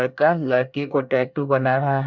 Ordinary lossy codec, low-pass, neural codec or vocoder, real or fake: none; 7.2 kHz; codec, 24 kHz, 1 kbps, SNAC; fake